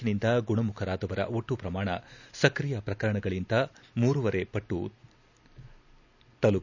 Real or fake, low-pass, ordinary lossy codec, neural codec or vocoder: real; 7.2 kHz; none; none